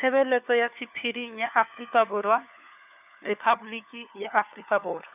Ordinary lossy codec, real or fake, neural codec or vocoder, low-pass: none; fake; codec, 16 kHz, 4 kbps, FunCodec, trained on LibriTTS, 50 frames a second; 3.6 kHz